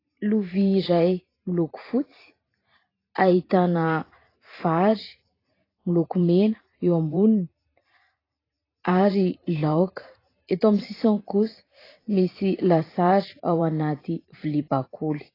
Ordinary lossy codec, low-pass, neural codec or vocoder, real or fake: AAC, 24 kbps; 5.4 kHz; vocoder, 44.1 kHz, 128 mel bands every 512 samples, BigVGAN v2; fake